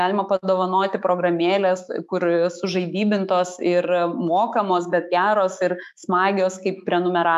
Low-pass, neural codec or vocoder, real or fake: 14.4 kHz; autoencoder, 48 kHz, 128 numbers a frame, DAC-VAE, trained on Japanese speech; fake